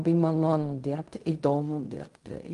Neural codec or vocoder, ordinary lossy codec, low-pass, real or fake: codec, 16 kHz in and 24 kHz out, 0.4 kbps, LongCat-Audio-Codec, fine tuned four codebook decoder; Opus, 32 kbps; 10.8 kHz; fake